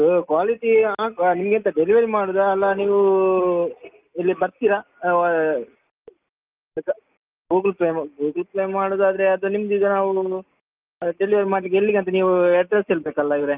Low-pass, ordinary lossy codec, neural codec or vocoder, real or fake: 3.6 kHz; Opus, 24 kbps; none; real